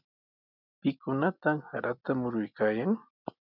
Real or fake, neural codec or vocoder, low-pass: real; none; 5.4 kHz